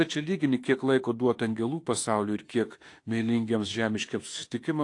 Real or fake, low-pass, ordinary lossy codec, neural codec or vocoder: fake; 10.8 kHz; AAC, 48 kbps; autoencoder, 48 kHz, 32 numbers a frame, DAC-VAE, trained on Japanese speech